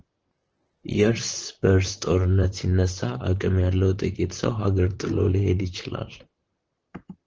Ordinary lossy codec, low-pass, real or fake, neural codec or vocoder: Opus, 16 kbps; 7.2 kHz; fake; vocoder, 44.1 kHz, 128 mel bands, Pupu-Vocoder